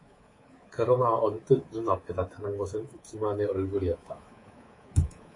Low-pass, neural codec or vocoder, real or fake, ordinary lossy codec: 10.8 kHz; codec, 24 kHz, 3.1 kbps, DualCodec; fake; AAC, 32 kbps